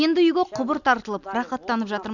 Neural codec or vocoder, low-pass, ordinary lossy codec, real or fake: none; 7.2 kHz; none; real